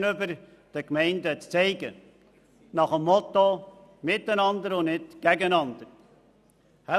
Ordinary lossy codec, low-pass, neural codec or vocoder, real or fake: none; 14.4 kHz; none; real